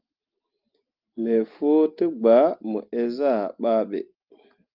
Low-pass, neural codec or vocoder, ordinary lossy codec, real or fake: 5.4 kHz; none; Opus, 32 kbps; real